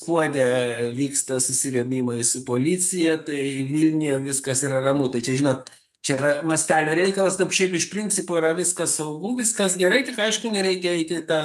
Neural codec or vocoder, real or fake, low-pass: codec, 44.1 kHz, 2.6 kbps, SNAC; fake; 14.4 kHz